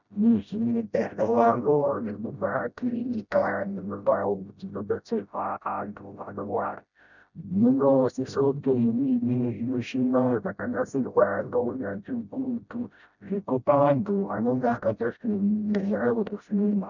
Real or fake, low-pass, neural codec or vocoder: fake; 7.2 kHz; codec, 16 kHz, 0.5 kbps, FreqCodec, smaller model